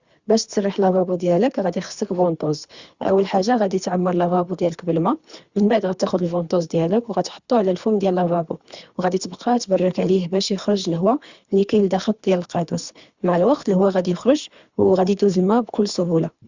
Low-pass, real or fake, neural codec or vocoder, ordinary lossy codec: 7.2 kHz; fake; codec, 24 kHz, 3 kbps, HILCodec; Opus, 64 kbps